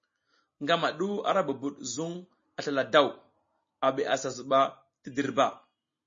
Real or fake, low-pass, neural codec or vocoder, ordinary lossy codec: real; 7.2 kHz; none; MP3, 32 kbps